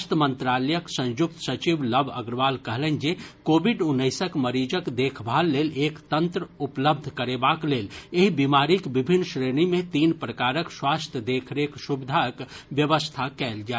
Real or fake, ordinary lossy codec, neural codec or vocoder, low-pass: real; none; none; none